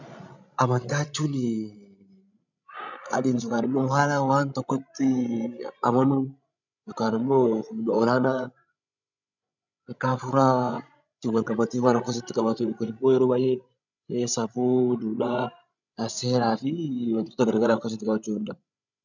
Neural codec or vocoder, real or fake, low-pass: codec, 16 kHz, 16 kbps, FreqCodec, larger model; fake; 7.2 kHz